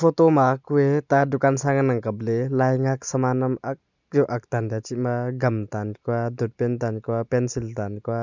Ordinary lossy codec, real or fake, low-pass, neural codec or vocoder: none; real; 7.2 kHz; none